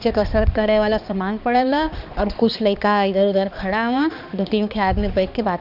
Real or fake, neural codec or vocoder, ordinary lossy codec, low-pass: fake; codec, 16 kHz, 2 kbps, X-Codec, HuBERT features, trained on balanced general audio; none; 5.4 kHz